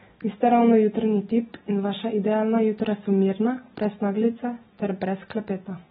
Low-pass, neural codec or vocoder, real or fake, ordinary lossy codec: 7.2 kHz; none; real; AAC, 16 kbps